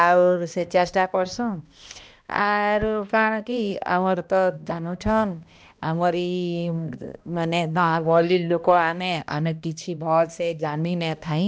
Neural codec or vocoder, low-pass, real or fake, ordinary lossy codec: codec, 16 kHz, 1 kbps, X-Codec, HuBERT features, trained on balanced general audio; none; fake; none